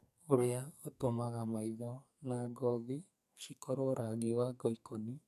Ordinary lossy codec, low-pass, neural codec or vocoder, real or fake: none; 14.4 kHz; codec, 44.1 kHz, 2.6 kbps, SNAC; fake